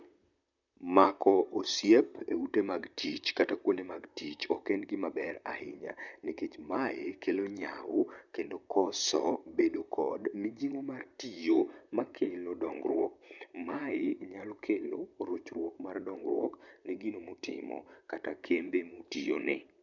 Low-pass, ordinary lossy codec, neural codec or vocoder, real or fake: 7.2 kHz; none; vocoder, 44.1 kHz, 128 mel bands, Pupu-Vocoder; fake